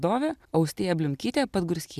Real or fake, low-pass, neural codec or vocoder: real; 14.4 kHz; none